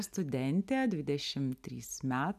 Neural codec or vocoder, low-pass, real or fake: none; 14.4 kHz; real